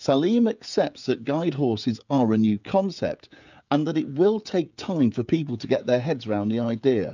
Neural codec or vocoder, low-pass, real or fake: codec, 16 kHz, 16 kbps, FreqCodec, smaller model; 7.2 kHz; fake